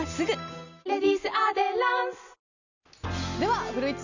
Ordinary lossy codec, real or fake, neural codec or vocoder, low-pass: none; real; none; 7.2 kHz